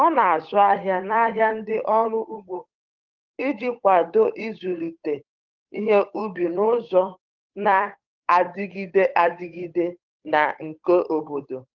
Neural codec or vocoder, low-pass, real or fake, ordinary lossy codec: codec, 16 kHz, 8 kbps, FunCodec, trained on Chinese and English, 25 frames a second; 7.2 kHz; fake; Opus, 24 kbps